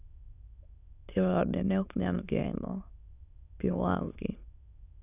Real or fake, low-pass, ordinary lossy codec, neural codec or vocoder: fake; 3.6 kHz; none; autoencoder, 22.05 kHz, a latent of 192 numbers a frame, VITS, trained on many speakers